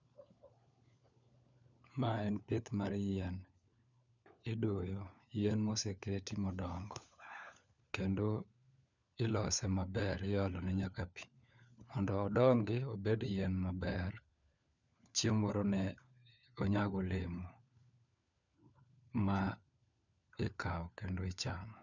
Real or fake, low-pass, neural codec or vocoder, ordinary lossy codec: fake; 7.2 kHz; codec, 16 kHz, 4 kbps, FunCodec, trained on LibriTTS, 50 frames a second; none